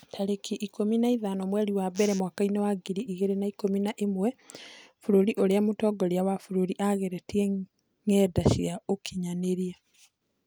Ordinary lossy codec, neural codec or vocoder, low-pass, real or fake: none; none; none; real